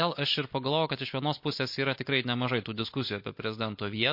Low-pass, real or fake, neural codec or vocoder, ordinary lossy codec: 5.4 kHz; real; none; MP3, 32 kbps